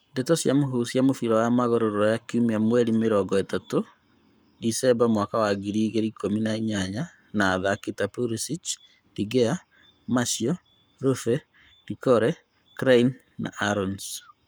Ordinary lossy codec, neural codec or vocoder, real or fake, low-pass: none; codec, 44.1 kHz, 7.8 kbps, Pupu-Codec; fake; none